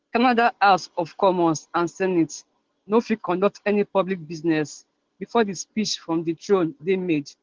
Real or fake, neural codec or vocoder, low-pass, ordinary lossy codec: real; none; 7.2 kHz; Opus, 16 kbps